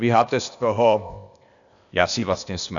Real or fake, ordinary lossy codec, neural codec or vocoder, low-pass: fake; MP3, 96 kbps; codec, 16 kHz, 0.8 kbps, ZipCodec; 7.2 kHz